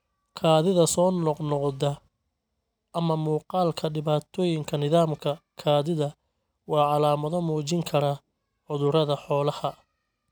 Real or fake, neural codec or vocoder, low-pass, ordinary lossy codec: real; none; none; none